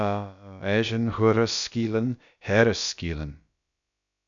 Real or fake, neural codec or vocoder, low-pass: fake; codec, 16 kHz, about 1 kbps, DyCAST, with the encoder's durations; 7.2 kHz